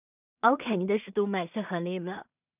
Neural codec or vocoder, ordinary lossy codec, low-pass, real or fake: codec, 16 kHz in and 24 kHz out, 0.4 kbps, LongCat-Audio-Codec, two codebook decoder; none; 3.6 kHz; fake